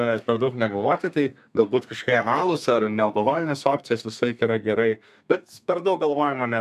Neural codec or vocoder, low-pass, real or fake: codec, 32 kHz, 1.9 kbps, SNAC; 14.4 kHz; fake